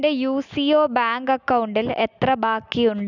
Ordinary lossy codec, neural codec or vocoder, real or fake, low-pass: none; none; real; 7.2 kHz